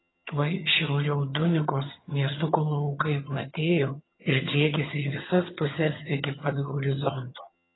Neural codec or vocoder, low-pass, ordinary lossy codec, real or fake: vocoder, 22.05 kHz, 80 mel bands, HiFi-GAN; 7.2 kHz; AAC, 16 kbps; fake